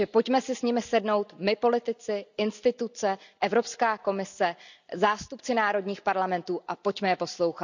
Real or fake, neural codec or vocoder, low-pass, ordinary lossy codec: real; none; 7.2 kHz; none